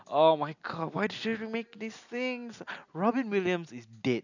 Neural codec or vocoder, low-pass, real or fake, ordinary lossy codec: none; 7.2 kHz; real; none